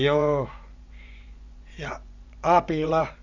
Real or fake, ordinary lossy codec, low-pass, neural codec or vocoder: fake; none; 7.2 kHz; vocoder, 24 kHz, 100 mel bands, Vocos